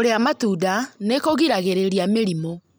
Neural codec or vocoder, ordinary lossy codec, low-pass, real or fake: none; none; none; real